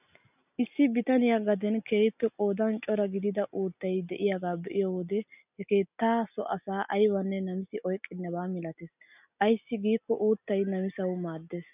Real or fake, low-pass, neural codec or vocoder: real; 3.6 kHz; none